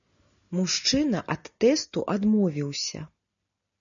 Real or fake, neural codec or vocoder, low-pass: real; none; 7.2 kHz